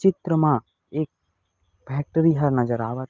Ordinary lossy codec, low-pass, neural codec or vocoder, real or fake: Opus, 24 kbps; 7.2 kHz; none; real